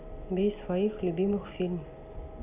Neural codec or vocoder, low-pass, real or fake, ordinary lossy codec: none; 3.6 kHz; real; none